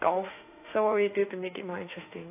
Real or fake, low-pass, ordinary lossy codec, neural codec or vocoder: fake; 3.6 kHz; AAC, 24 kbps; autoencoder, 48 kHz, 32 numbers a frame, DAC-VAE, trained on Japanese speech